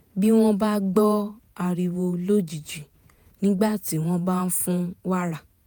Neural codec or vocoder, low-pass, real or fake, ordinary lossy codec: vocoder, 48 kHz, 128 mel bands, Vocos; none; fake; none